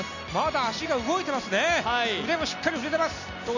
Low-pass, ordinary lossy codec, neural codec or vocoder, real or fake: 7.2 kHz; none; none; real